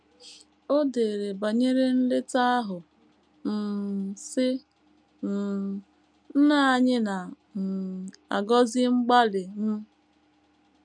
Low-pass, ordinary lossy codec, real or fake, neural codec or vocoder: 9.9 kHz; none; real; none